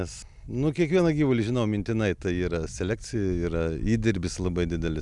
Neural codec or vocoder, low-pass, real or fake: none; 10.8 kHz; real